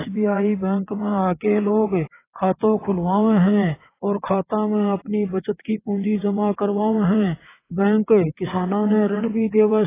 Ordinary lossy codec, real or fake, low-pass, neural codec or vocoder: AAC, 16 kbps; fake; 3.6 kHz; vocoder, 22.05 kHz, 80 mel bands, WaveNeXt